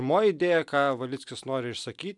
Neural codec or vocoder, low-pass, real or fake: none; 10.8 kHz; real